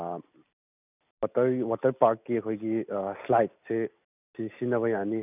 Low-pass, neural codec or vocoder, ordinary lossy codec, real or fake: 3.6 kHz; none; none; real